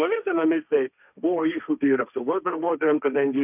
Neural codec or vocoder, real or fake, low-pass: codec, 16 kHz, 1.1 kbps, Voila-Tokenizer; fake; 3.6 kHz